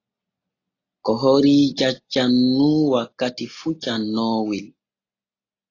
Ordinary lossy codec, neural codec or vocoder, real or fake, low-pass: AAC, 32 kbps; none; real; 7.2 kHz